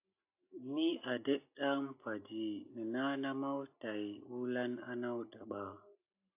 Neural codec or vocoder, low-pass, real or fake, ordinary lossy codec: none; 3.6 kHz; real; AAC, 32 kbps